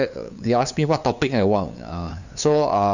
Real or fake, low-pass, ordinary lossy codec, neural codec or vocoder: fake; 7.2 kHz; none; codec, 16 kHz, 2 kbps, FunCodec, trained on LibriTTS, 25 frames a second